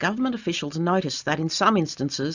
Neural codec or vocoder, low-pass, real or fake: none; 7.2 kHz; real